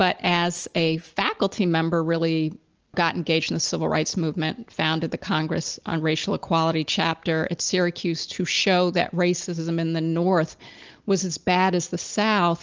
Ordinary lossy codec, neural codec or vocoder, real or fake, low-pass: Opus, 24 kbps; none; real; 7.2 kHz